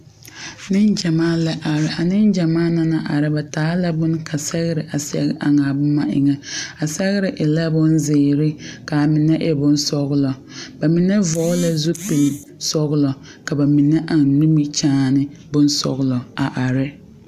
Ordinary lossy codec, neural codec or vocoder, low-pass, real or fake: AAC, 96 kbps; none; 14.4 kHz; real